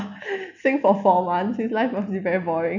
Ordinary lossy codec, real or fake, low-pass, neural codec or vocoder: none; real; 7.2 kHz; none